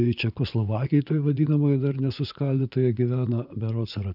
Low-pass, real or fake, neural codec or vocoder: 5.4 kHz; fake; vocoder, 24 kHz, 100 mel bands, Vocos